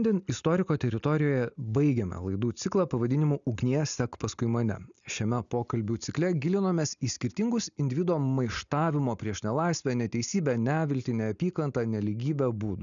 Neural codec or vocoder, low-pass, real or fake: none; 7.2 kHz; real